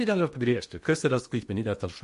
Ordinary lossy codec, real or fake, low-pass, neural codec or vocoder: MP3, 48 kbps; fake; 10.8 kHz; codec, 16 kHz in and 24 kHz out, 0.8 kbps, FocalCodec, streaming, 65536 codes